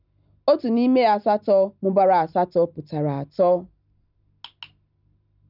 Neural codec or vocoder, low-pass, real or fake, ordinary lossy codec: none; 5.4 kHz; real; none